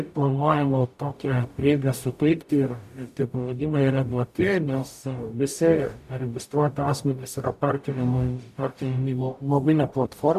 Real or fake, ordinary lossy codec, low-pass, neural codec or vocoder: fake; AAC, 96 kbps; 14.4 kHz; codec, 44.1 kHz, 0.9 kbps, DAC